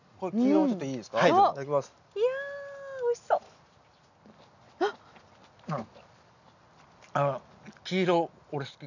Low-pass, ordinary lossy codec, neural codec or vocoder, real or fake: 7.2 kHz; none; none; real